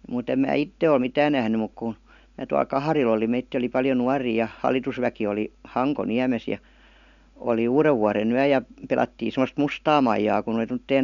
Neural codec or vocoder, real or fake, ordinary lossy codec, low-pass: none; real; none; 7.2 kHz